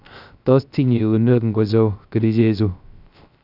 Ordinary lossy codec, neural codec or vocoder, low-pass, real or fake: none; codec, 16 kHz, 0.3 kbps, FocalCodec; 5.4 kHz; fake